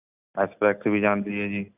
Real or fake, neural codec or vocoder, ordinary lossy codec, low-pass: real; none; none; 3.6 kHz